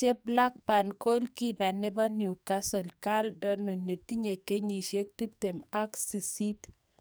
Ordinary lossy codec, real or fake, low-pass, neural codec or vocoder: none; fake; none; codec, 44.1 kHz, 2.6 kbps, SNAC